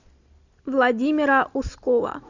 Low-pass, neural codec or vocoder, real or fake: 7.2 kHz; none; real